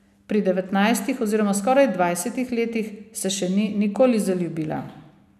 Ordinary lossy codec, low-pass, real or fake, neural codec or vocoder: none; 14.4 kHz; real; none